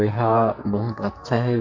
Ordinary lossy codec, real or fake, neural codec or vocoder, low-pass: MP3, 64 kbps; fake; codec, 44.1 kHz, 2.6 kbps, SNAC; 7.2 kHz